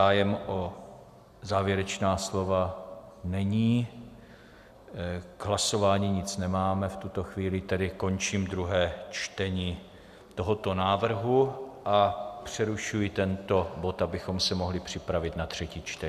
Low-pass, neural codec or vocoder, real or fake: 14.4 kHz; none; real